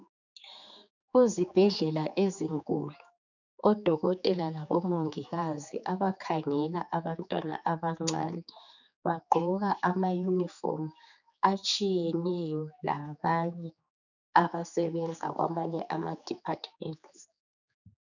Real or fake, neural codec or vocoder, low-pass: fake; codec, 16 kHz, 4 kbps, X-Codec, HuBERT features, trained on general audio; 7.2 kHz